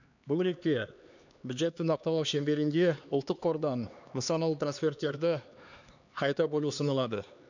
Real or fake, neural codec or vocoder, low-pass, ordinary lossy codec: fake; codec, 16 kHz, 2 kbps, X-Codec, HuBERT features, trained on LibriSpeech; 7.2 kHz; none